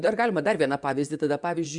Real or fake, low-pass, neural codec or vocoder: fake; 10.8 kHz; vocoder, 24 kHz, 100 mel bands, Vocos